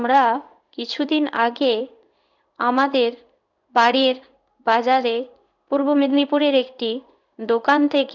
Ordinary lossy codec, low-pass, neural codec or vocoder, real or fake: none; 7.2 kHz; codec, 16 kHz in and 24 kHz out, 1 kbps, XY-Tokenizer; fake